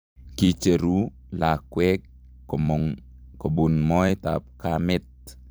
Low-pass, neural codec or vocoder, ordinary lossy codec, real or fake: none; vocoder, 44.1 kHz, 128 mel bands every 256 samples, BigVGAN v2; none; fake